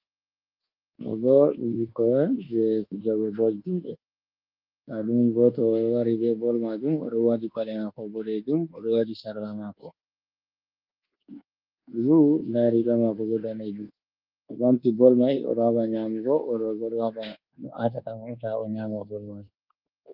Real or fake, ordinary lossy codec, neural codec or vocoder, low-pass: fake; Opus, 32 kbps; codec, 24 kHz, 1.2 kbps, DualCodec; 5.4 kHz